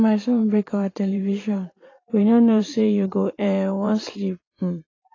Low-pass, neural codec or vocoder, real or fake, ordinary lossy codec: 7.2 kHz; vocoder, 44.1 kHz, 128 mel bands every 512 samples, BigVGAN v2; fake; AAC, 32 kbps